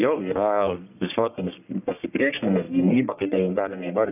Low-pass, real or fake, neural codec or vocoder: 3.6 kHz; fake; codec, 44.1 kHz, 1.7 kbps, Pupu-Codec